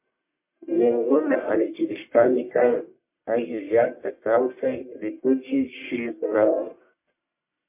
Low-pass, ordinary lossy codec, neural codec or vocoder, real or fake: 3.6 kHz; MP3, 24 kbps; codec, 44.1 kHz, 1.7 kbps, Pupu-Codec; fake